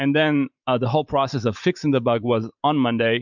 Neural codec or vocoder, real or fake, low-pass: vocoder, 44.1 kHz, 128 mel bands every 512 samples, BigVGAN v2; fake; 7.2 kHz